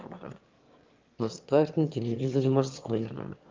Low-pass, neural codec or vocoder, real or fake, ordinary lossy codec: 7.2 kHz; autoencoder, 22.05 kHz, a latent of 192 numbers a frame, VITS, trained on one speaker; fake; Opus, 32 kbps